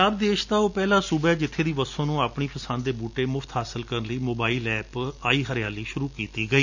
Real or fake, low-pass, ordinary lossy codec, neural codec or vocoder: real; 7.2 kHz; none; none